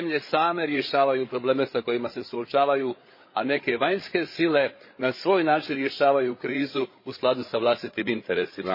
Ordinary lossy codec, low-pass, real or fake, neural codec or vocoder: MP3, 24 kbps; 5.4 kHz; fake; codec, 16 kHz, 8 kbps, FreqCodec, larger model